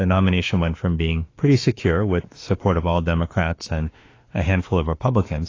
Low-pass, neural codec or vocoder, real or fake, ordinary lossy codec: 7.2 kHz; autoencoder, 48 kHz, 32 numbers a frame, DAC-VAE, trained on Japanese speech; fake; AAC, 32 kbps